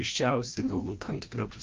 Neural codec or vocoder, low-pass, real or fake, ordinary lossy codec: codec, 16 kHz, 1 kbps, FreqCodec, larger model; 7.2 kHz; fake; Opus, 24 kbps